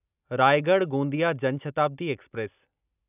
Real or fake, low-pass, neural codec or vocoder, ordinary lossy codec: real; 3.6 kHz; none; none